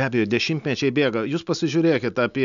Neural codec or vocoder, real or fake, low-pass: none; real; 7.2 kHz